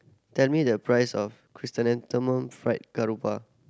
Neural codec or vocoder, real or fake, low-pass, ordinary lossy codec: none; real; none; none